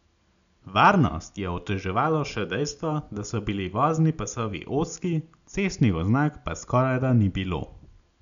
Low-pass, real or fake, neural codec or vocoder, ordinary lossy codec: 7.2 kHz; real; none; none